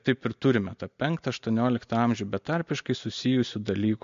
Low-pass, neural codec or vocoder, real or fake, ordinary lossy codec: 7.2 kHz; none; real; MP3, 48 kbps